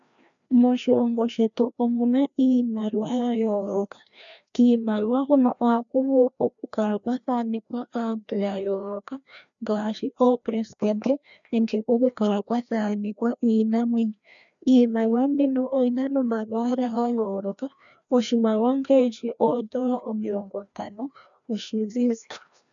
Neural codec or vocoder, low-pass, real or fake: codec, 16 kHz, 1 kbps, FreqCodec, larger model; 7.2 kHz; fake